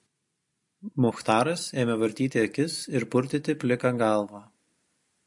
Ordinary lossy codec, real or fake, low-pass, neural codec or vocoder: MP3, 48 kbps; real; 10.8 kHz; none